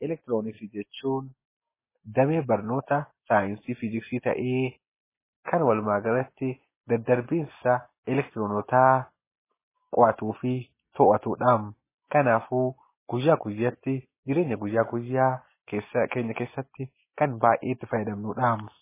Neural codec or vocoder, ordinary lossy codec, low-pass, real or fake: none; MP3, 16 kbps; 3.6 kHz; real